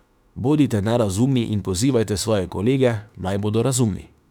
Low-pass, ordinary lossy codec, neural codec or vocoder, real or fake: 19.8 kHz; none; autoencoder, 48 kHz, 32 numbers a frame, DAC-VAE, trained on Japanese speech; fake